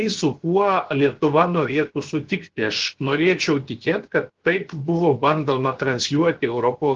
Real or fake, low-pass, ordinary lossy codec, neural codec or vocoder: fake; 7.2 kHz; Opus, 16 kbps; codec, 16 kHz, 0.7 kbps, FocalCodec